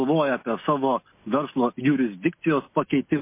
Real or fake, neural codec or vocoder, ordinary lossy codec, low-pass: real; none; MP3, 24 kbps; 3.6 kHz